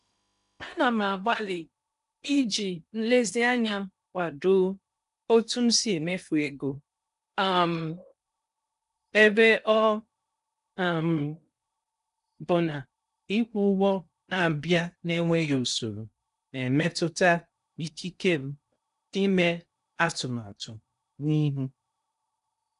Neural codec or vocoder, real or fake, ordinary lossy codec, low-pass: codec, 16 kHz in and 24 kHz out, 0.8 kbps, FocalCodec, streaming, 65536 codes; fake; none; 10.8 kHz